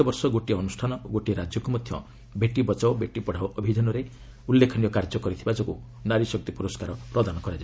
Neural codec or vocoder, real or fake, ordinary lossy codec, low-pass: none; real; none; none